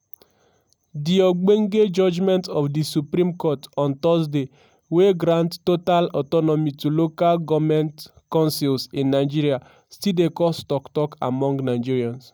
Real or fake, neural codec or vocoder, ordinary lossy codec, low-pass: real; none; none; none